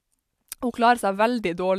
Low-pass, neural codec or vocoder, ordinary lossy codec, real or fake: 14.4 kHz; none; AAC, 96 kbps; real